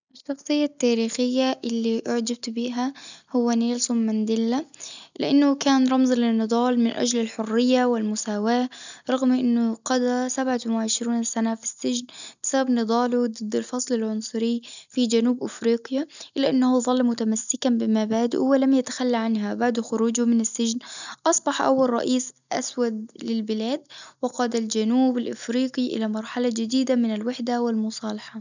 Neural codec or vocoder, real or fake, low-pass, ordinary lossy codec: none; real; 7.2 kHz; none